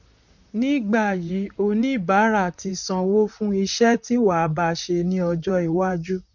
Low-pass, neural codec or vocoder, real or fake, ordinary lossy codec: 7.2 kHz; vocoder, 44.1 kHz, 128 mel bands, Pupu-Vocoder; fake; none